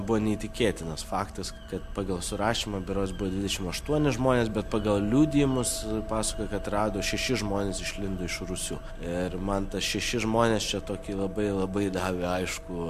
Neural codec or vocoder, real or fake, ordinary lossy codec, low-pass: none; real; MP3, 64 kbps; 14.4 kHz